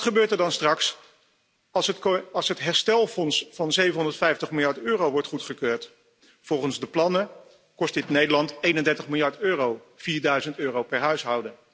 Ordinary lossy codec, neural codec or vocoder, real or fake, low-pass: none; none; real; none